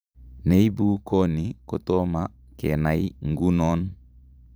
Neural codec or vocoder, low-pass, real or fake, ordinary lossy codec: vocoder, 44.1 kHz, 128 mel bands every 512 samples, BigVGAN v2; none; fake; none